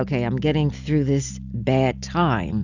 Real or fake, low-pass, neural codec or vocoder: real; 7.2 kHz; none